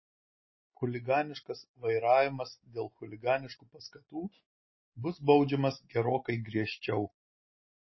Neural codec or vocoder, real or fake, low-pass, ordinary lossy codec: none; real; 7.2 kHz; MP3, 24 kbps